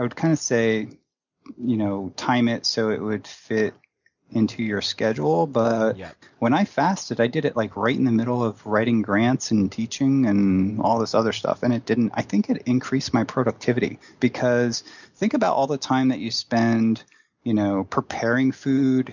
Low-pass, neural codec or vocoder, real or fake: 7.2 kHz; none; real